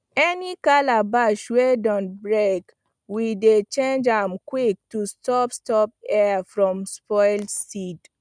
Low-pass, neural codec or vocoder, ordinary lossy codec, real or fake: 9.9 kHz; none; none; real